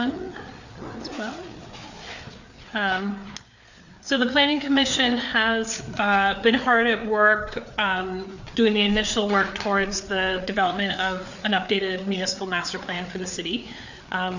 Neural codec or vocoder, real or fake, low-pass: codec, 16 kHz, 4 kbps, FreqCodec, larger model; fake; 7.2 kHz